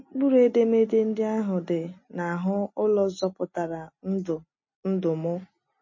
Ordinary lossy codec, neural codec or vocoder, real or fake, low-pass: MP3, 32 kbps; none; real; 7.2 kHz